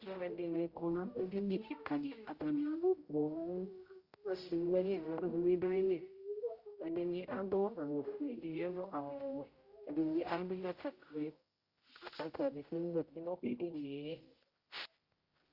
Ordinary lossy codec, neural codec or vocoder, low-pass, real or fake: Opus, 32 kbps; codec, 16 kHz, 0.5 kbps, X-Codec, HuBERT features, trained on general audio; 5.4 kHz; fake